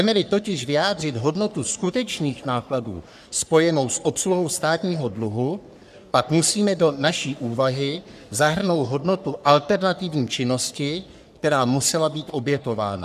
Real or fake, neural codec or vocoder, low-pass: fake; codec, 44.1 kHz, 3.4 kbps, Pupu-Codec; 14.4 kHz